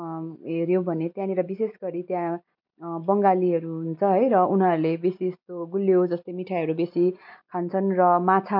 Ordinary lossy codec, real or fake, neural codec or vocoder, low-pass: MP3, 48 kbps; real; none; 5.4 kHz